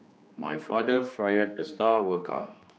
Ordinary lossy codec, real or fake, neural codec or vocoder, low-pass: none; fake; codec, 16 kHz, 2 kbps, X-Codec, HuBERT features, trained on general audio; none